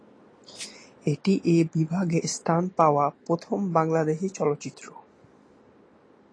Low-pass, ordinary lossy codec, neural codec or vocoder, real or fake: 9.9 kHz; AAC, 32 kbps; none; real